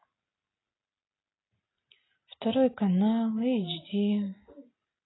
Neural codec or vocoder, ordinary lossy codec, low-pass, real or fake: none; AAC, 16 kbps; 7.2 kHz; real